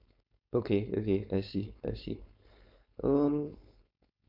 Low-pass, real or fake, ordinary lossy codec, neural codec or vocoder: 5.4 kHz; fake; none; codec, 16 kHz, 4.8 kbps, FACodec